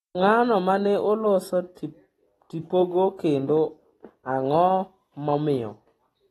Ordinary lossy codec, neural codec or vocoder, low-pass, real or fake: AAC, 32 kbps; none; 19.8 kHz; real